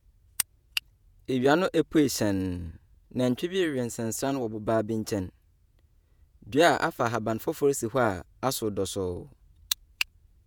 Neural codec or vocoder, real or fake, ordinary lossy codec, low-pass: vocoder, 48 kHz, 128 mel bands, Vocos; fake; none; none